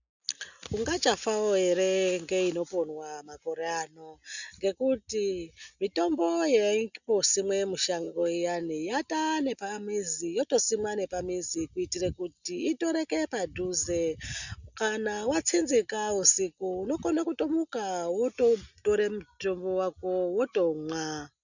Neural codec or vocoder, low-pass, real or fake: none; 7.2 kHz; real